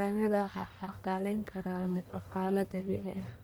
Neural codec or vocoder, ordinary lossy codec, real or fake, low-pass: codec, 44.1 kHz, 1.7 kbps, Pupu-Codec; none; fake; none